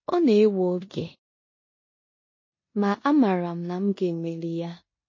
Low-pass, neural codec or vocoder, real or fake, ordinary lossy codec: 7.2 kHz; codec, 16 kHz in and 24 kHz out, 0.9 kbps, LongCat-Audio-Codec, four codebook decoder; fake; MP3, 32 kbps